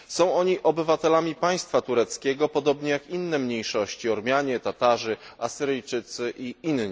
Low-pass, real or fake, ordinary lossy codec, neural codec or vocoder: none; real; none; none